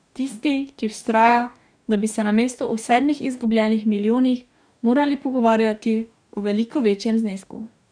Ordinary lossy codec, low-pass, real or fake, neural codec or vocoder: none; 9.9 kHz; fake; codec, 44.1 kHz, 2.6 kbps, DAC